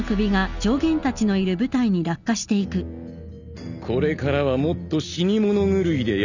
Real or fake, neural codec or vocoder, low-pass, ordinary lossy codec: real; none; 7.2 kHz; none